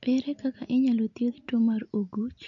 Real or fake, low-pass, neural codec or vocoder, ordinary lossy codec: real; 7.2 kHz; none; none